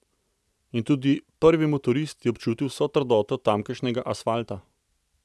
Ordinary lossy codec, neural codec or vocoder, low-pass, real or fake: none; none; none; real